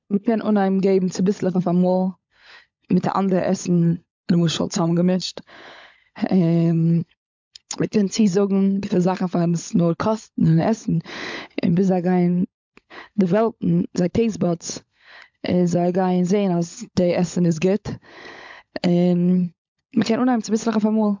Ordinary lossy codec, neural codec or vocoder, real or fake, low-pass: MP3, 64 kbps; codec, 16 kHz, 16 kbps, FunCodec, trained on LibriTTS, 50 frames a second; fake; 7.2 kHz